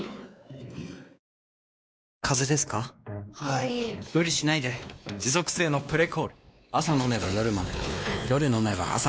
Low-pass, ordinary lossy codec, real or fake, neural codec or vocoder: none; none; fake; codec, 16 kHz, 2 kbps, X-Codec, WavLM features, trained on Multilingual LibriSpeech